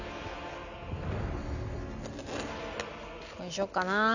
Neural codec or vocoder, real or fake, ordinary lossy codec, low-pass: none; real; none; 7.2 kHz